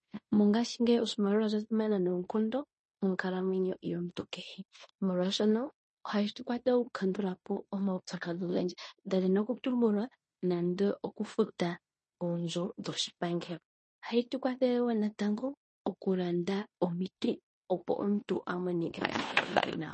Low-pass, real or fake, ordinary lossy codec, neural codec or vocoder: 10.8 kHz; fake; MP3, 32 kbps; codec, 16 kHz in and 24 kHz out, 0.9 kbps, LongCat-Audio-Codec, fine tuned four codebook decoder